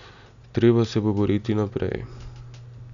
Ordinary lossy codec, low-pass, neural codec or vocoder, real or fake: none; 7.2 kHz; none; real